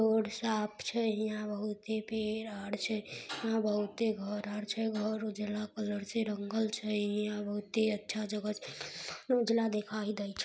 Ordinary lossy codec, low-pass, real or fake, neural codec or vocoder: none; none; real; none